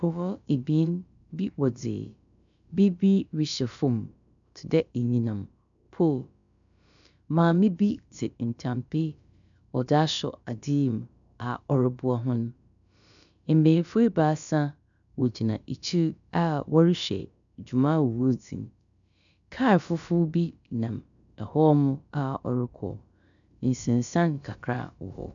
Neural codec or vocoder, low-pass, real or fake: codec, 16 kHz, about 1 kbps, DyCAST, with the encoder's durations; 7.2 kHz; fake